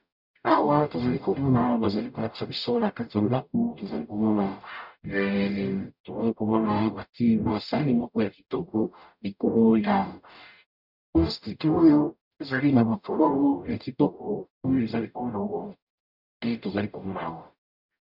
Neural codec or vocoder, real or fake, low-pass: codec, 44.1 kHz, 0.9 kbps, DAC; fake; 5.4 kHz